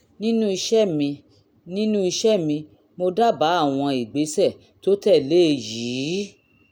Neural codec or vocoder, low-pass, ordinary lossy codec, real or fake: none; 19.8 kHz; none; real